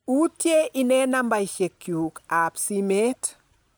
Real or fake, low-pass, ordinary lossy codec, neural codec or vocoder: real; none; none; none